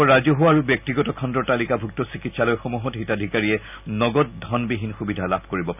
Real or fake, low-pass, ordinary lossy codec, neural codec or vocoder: real; 3.6 kHz; none; none